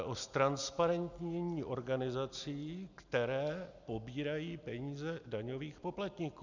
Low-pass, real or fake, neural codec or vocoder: 7.2 kHz; real; none